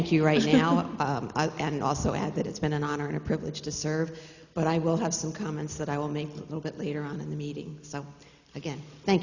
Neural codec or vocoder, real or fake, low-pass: none; real; 7.2 kHz